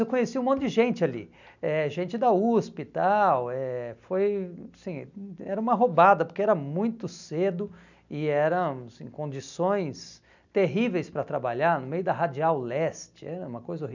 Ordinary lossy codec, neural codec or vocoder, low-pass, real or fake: none; none; 7.2 kHz; real